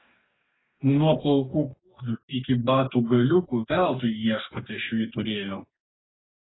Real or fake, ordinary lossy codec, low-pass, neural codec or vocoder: fake; AAC, 16 kbps; 7.2 kHz; codec, 44.1 kHz, 2.6 kbps, DAC